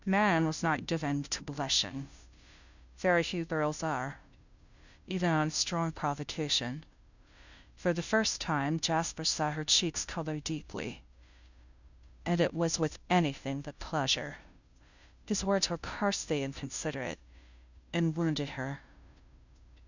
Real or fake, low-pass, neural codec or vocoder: fake; 7.2 kHz; codec, 16 kHz, 0.5 kbps, FunCodec, trained on Chinese and English, 25 frames a second